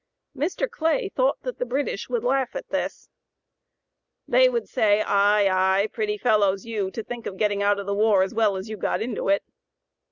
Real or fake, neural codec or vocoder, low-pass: real; none; 7.2 kHz